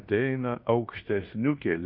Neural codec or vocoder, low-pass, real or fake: codec, 16 kHz in and 24 kHz out, 0.9 kbps, LongCat-Audio-Codec, fine tuned four codebook decoder; 5.4 kHz; fake